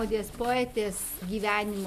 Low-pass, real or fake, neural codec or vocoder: 14.4 kHz; real; none